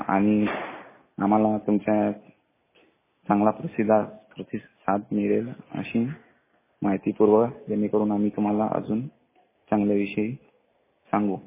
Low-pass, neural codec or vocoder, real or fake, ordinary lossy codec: 3.6 kHz; none; real; MP3, 16 kbps